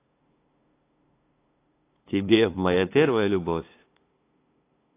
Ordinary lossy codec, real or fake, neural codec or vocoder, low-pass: AAC, 24 kbps; fake; codec, 16 kHz, 2 kbps, FunCodec, trained on LibriTTS, 25 frames a second; 3.6 kHz